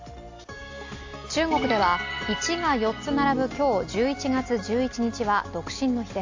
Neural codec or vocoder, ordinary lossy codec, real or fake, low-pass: none; AAC, 48 kbps; real; 7.2 kHz